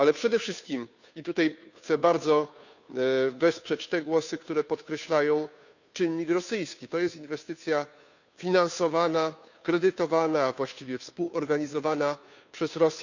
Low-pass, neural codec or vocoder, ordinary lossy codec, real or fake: 7.2 kHz; codec, 16 kHz, 2 kbps, FunCodec, trained on Chinese and English, 25 frames a second; none; fake